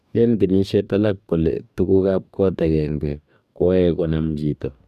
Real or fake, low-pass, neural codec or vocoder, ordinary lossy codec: fake; 14.4 kHz; codec, 44.1 kHz, 2.6 kbps, DAC; none